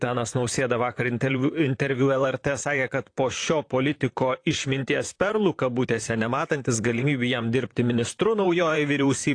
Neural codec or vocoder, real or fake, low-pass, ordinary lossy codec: vocoder, 44.1 kHz, 128 mel bands, Pupu-Vocoder; fake; 9.9 kHz; AAC, 48 kbps